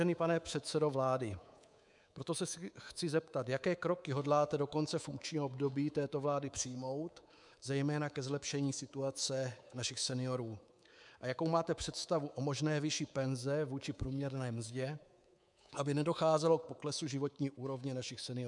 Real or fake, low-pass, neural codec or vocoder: fake; 10.8 kHz; codec, 24 kHz, 3.1 kbps, DualCodec